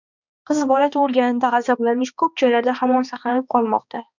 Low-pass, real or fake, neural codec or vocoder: 7.2 kHz; fake; codec, 16 kHz, 2 kbps, X-Codec, HuBERT features, trained on balanced general audio